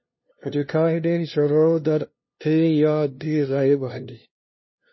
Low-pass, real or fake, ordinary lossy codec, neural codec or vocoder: 7.2 kHz; fake; MP3, 24 kbps; codec, 16 kHz, 0.5 kbps, FunCodec, trained on LibriTTS, 25 frames a second